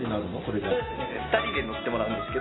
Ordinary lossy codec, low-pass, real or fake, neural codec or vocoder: AAC, 16 kbps; 7.2 kHz; real; none